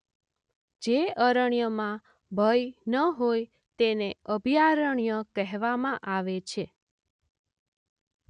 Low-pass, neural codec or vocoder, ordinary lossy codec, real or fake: 9.9 kHz; none; none; real